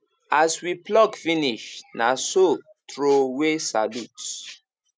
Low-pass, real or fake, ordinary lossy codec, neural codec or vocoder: none; real; none; none